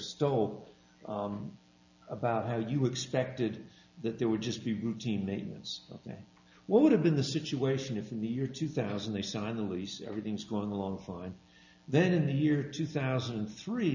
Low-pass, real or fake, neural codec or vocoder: 7.2 kHz; real; none